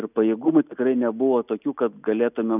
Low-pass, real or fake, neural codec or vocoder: 3.6 kHz; real; none